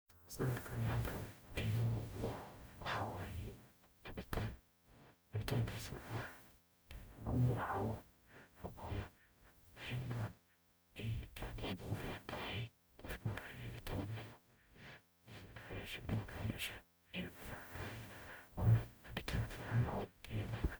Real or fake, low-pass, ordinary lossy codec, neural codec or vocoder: fake; none; none; codec, 44.1 kHz, 0.9 kbps, DAC